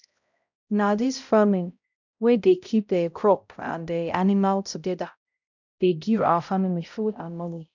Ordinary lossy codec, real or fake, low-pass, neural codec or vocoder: none; fake; 7.2 kHz; codec, 16 kHz, 0.5 kbps, X-Codec, HuBERT features, trained on balanced general audio